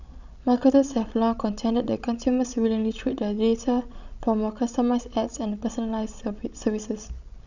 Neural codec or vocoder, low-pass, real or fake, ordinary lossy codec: codec, 16 kHz, 16 kbps, FreqCodec, larger model; 7.2 kHz; fake; none